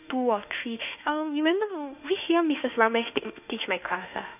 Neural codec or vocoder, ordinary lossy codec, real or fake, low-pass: autoencoder, 48 kHz, 32 numbers a frame, DAC-VAE, trained on Japanese speech; none; fake; 3.6 kHz